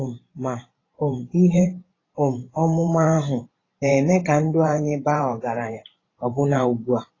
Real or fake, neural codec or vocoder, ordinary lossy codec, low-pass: fake; vocoder, 44.1 kHz, 128 mel bands every 512 samples, BigVGAN v2; AAC, 32 kbps; 7.2 kHz